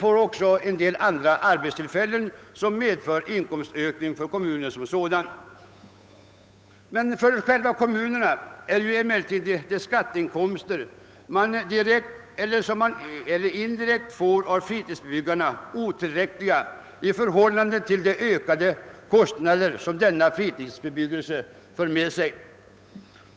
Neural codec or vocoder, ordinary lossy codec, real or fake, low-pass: codec, 16 kHz, 8 kbps, FunCodec, trained on Chinese and English, 25 frames a second; none; fake; none